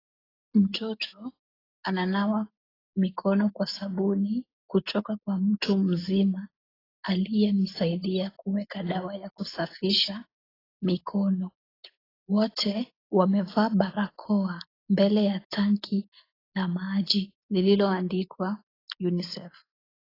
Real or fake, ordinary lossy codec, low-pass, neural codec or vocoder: real; AAC, 24 kbps; 5.4 kHz; none